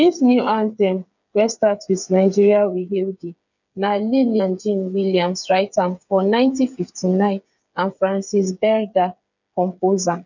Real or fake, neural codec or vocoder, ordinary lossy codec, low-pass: fake; codec, 16 kHz, 8 kbps, FreqCodec, smaller model; none; 7.2 kHz